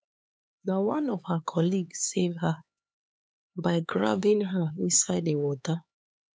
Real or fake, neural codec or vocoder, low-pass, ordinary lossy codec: fake; codec, 16 kHz, 4 kbps, X-Codec, HuBERT features, trained on LibriSpeech; none; none